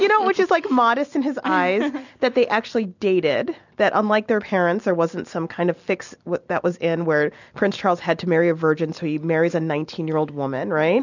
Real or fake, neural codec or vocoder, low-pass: real; none; 7.2 kHz